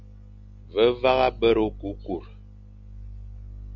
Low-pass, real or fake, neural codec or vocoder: 7.2 kHz; real; none